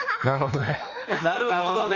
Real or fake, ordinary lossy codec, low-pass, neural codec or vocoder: fake; Opus, 32 kbps; 7.2 kHz; autoencoder, 48 kHz, 32 numbers a frame, DAC-VAE, trained on Japanese speech